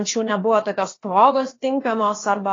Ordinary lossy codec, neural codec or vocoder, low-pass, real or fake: AAC, 32 kbps; codec, 16 kHz, about 1 kbps, DyCAST, with the encoder's durations; 7.2 kHz; fake